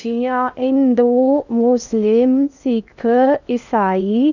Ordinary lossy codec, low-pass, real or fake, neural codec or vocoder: none; 7.2 kHz; fake; codec, 16 kHz in and 24 kHz out, 0.6 kbps, FocalCodec, streaming, 2048 codes